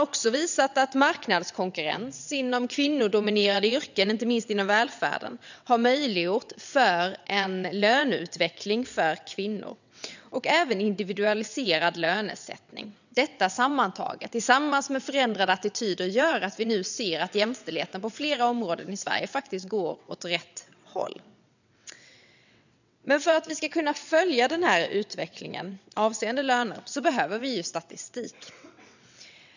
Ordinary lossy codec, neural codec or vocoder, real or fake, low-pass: none; vocoder, 22.05 kHz, 80 mel bands, WaveNeXt; fake; 7.2 kHz